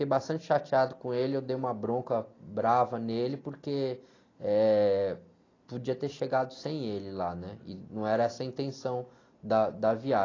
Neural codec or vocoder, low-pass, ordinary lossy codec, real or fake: none; 7.2 kHz; AAC, 32 kbps; real